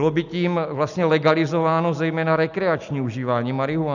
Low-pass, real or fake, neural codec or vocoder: 7.2 kHz; real; none